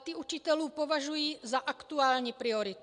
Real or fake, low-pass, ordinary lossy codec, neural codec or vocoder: real; 9.9 kHz; MP3, 64 kbps; none